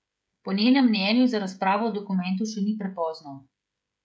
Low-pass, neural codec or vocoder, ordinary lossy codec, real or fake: none; codec, 16 kHz, 16 kbps, FreqCodec, smaller model; none; fake